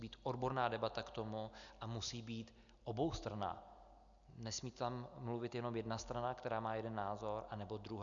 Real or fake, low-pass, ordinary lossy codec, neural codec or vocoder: real; 7.2 kHz; MP3, 96 kbps; none